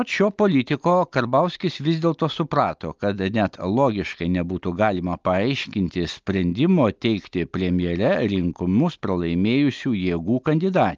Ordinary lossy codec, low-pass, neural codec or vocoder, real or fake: Opus, 32 kbps; 7.2 kHz; none; real